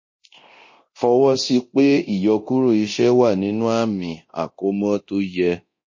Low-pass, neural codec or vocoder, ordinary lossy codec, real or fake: 7.2 kHz; codec, 24 kHz, 0.9 kbps, DualCodec; MP3, 32 kbps; fake